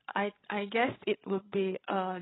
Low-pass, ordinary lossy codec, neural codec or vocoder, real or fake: 7.2 kHz; AAC, 16 kbps; codec, 16 kHz, 16 kbps, FreqCodec, larger model; fake